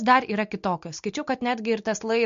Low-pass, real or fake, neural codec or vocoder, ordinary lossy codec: 7.2 kHz; real; none; MP3, 48 kbps